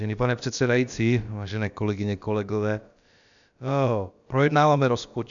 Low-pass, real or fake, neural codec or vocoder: 7.2 kHz; fake; codec, 16 kHz, about 1 kbps, DyCAST, with the encoder's durations